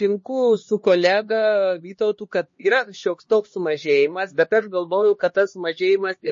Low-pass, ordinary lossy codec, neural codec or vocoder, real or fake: 7.2 kHz; MP3, 32 kbps; codec, 16 kHz, 2 kbps, X-Codec, HuBERT features, trained on LibriSpeech; fake